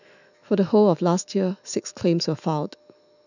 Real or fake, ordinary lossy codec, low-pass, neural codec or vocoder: fake; none; 7.2 kHz; autoencoder, 48 kHz, 128 numbers a frame, DAC-VAE, trained on Japanese speech